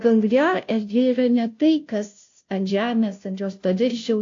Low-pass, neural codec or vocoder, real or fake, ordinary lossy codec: 7.2 kHz; codec, 16 kHz, 0.5 kbps, FunCodec, trained on Chinese and English, 25 frames a second; fake; AAC, 48 kbps